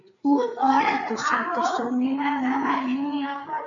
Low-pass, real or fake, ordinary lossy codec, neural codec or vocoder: 7.2 kHz; fake; MP3, 96 kbps; codec, 16 kHz, 4 kbps, FreqCodec, larger model